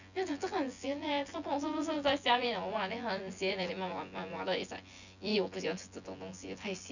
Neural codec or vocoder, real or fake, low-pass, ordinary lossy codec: vocoder, 24 kHz, 100 mel bands, Vocos; fake; 7.2 kHz; none